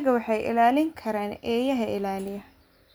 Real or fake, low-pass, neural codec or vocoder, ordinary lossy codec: real; none; none; none